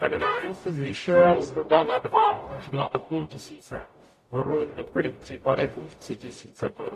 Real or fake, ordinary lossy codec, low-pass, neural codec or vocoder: fake; AAC, 48 kbps; 14.4 kHz; codec, 44.1 kHz, 0.9 kbps, DAC